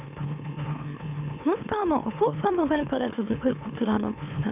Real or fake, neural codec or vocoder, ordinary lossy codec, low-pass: fake; autoencoder, 44.1 kHz, a latent of 192 numbers a frame, MeloTTS; none; 3.6 kHz